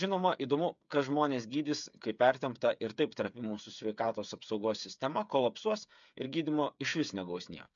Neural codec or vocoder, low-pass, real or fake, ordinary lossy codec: codec, 16 kHz, 8 kbps, FreqCodec, smaller model; 7.2 kHz; fake; MP3, 64 kbps